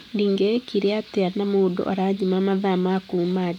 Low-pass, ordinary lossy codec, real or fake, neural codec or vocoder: 19.8 kHz; none; real; none